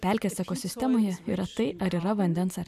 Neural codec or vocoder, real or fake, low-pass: none; real; 14.4 kHz